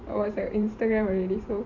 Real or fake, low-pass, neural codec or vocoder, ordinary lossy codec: fake; 7.2 kHz; vocoder, 44.1 kHz, 128 mel bands every 512 samples, BigVGAN v2; none